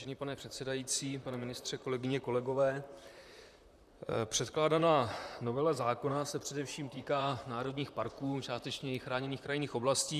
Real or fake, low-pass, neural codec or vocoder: fake; 14.4 kHz; vocoder, 44.1 kHz, 128 mel bands, Pupu-Vocoder